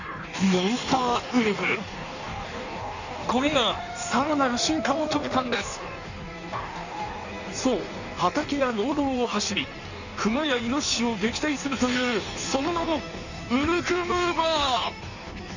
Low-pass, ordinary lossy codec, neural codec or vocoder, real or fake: 7.2 kHz; none; codec, 16 kHz in and 24 kHz out, 1.1 kbps, FireRedTTS-2 codec; fake